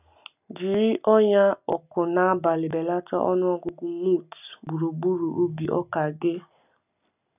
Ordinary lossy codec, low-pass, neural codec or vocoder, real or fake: none; 3.6 kHz; none; real